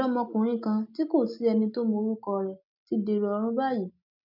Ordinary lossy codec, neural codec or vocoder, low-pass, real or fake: none; none; 5.4 kHz; real